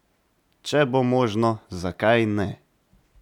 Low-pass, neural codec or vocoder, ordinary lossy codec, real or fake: 19.8 kHz; none; none; real